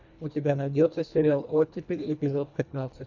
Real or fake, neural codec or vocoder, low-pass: fake; codec, 24 kHz, 1.5 kbps, HILCodec; 7.2 kHz